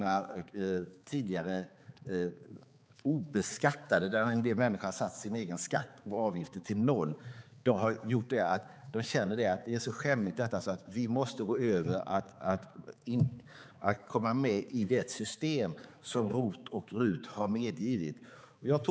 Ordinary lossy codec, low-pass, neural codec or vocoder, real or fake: none; none; codec, 16 kHz, 4 kbps, X-Codec, HuBERT features, trained on balanced general audio; fake